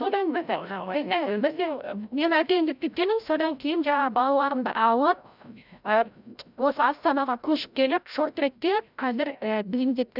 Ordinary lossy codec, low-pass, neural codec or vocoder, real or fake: none; 5.4 kHz; codec, 16 kHz, 0.5 kbps, FreqCodec, larger model; fake